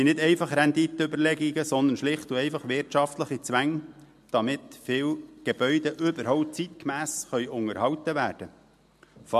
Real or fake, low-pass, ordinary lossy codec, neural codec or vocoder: real; 14.4 kHz; MP3, 64 kbps; none